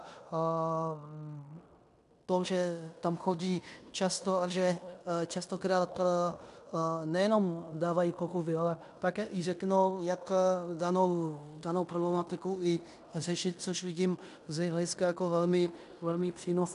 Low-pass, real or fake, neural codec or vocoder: 10.8 kHz; fake; codec, 16 kHz in and 24 kHz out, 0.9 kbps, LongCat-Audio-Codec, fine tuned four codebook decoder